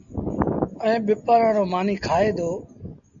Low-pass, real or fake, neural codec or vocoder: 7.2 kHz; real; none